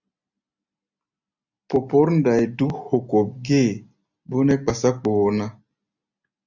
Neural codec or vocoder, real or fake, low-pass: none; real; 7.2 kHz